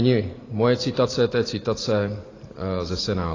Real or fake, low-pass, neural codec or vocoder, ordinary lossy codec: fake; 7.2 kHz; vocoder, 44.1 kHz, 128 mel bands every 512 samples, BigVGAN v2; AAC, 32 kbps